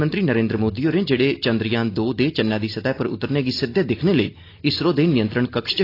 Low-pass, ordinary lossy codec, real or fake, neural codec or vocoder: 5.4 kHz; AAC, 32 kbps; real; none